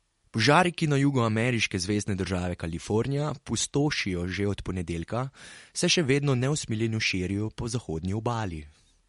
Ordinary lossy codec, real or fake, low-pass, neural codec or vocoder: MP3, 48 kbps; real; 19.8 kHz; none